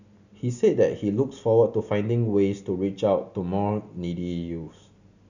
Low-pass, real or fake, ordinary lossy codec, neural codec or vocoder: 7.2 kHz; real; none; none